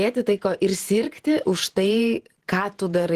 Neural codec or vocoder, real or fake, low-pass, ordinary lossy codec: vocoder, 48 kHz, 128 mel bands, Vocos; fake; 14.4 kHz; Opus, 16 kbps